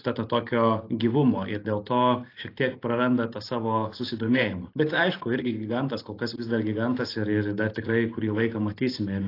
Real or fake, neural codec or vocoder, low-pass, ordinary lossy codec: real; none; 5.4 kHz; AAC, 32 kbps